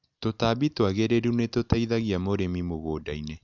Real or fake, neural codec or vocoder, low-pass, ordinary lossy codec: real; none; 7.2 kHz; none